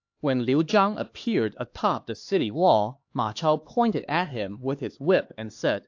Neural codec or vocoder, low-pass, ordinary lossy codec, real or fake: codec, 16 kHz, 2 kbps, X-Codec, HuBERT features, trained on LibriSpeech; 7.2 kHz; AAC, 48 kbps; fake